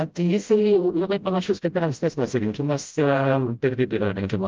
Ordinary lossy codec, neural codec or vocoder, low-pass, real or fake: Opus, 24 kbps; codec, 16 kHz, 0.5 kbps, FreqCodec, smaller model; 7.2 kHz; fake